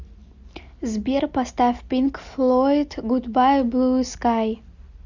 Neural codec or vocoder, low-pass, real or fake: none; 7.2 kHz; real